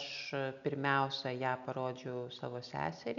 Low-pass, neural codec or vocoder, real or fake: 7.2 kHz; none; real